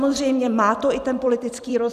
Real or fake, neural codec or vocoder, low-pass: fake; vocoder, 44.1 kHz, 128 mel bands every 256 samples, BigVGAN v2; 14.4 kHz